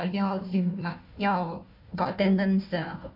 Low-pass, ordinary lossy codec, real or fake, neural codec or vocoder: 5.4 kHz; none; fake; codec, 16 kHz, 1 kbps, FunCodec, trained on Chinese and English, 50 frames a second